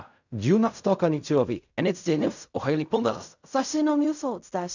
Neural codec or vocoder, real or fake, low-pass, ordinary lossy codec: codec, 16 kHz in and 24 kHz out, 0.4 kbps, LongCat-Audio-Codec, fine tuned four codebook decoder; fake; 7.2 kHz; none